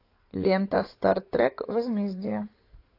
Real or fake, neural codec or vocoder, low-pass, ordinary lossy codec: fake; codec, 16 kHz in and 24 kHz out, 2.2 kbps, FireRedTTS-2 codec; 5.4 kHz; AAC, 32 kbps